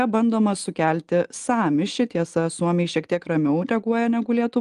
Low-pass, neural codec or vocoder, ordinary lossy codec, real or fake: 10.8 kHz; none; Opus, 24 kbps; real